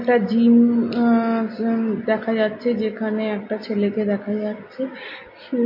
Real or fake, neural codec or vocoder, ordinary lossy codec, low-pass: real; none; MP3, 32 kbps; 5.4 kHz